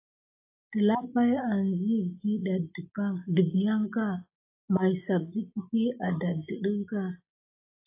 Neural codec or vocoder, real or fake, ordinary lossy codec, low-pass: none; real; AAC, 24 kbps; 3.6 kHz